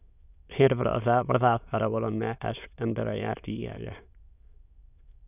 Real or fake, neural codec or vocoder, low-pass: fake; autoencoder, 22.05 kHz, a latent of 192 numbers a frame, VITS, trained on many speakers; 3.6 kHz